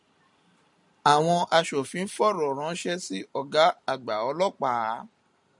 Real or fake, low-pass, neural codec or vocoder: real; 10.8 kHz; none